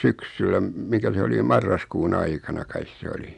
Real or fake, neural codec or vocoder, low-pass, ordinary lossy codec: real; none; 10.8 kHz; none